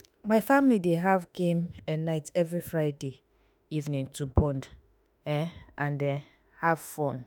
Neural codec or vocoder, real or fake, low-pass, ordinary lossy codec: autoencoder, 48 kHz, 32 numbers a frame, DAC-VAE, trained on Japanese speech; fake; none; none